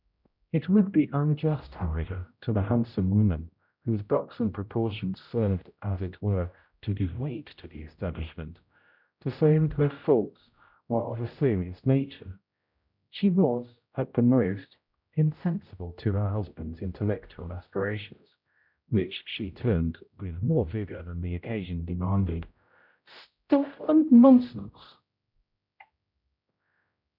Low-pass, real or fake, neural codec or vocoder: 5.4 kHz; fake; codec, 16 kHz, 0.5 kbps, X-Codec, HuBERT features, trained on general audio